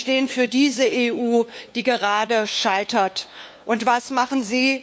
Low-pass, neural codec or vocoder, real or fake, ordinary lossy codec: none; codec, 16 kHz, 2 kbps, FunCodec, trained on LibriTTS, 25 frames a second; fake; none